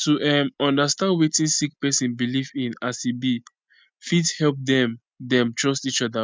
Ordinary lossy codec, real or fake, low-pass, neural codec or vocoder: none; real; none; none